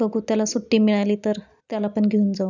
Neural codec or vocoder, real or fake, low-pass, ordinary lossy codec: none; real; 7.2 kHz; none